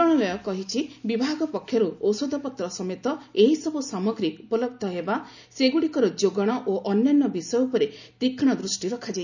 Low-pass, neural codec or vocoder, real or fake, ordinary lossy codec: 7.2 kHz; none; real; none